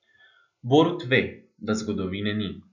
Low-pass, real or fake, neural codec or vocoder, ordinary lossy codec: 7.2 kHz; real; none; none